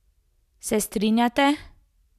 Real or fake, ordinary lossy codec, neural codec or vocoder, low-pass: real; none; none; 14.4 kHz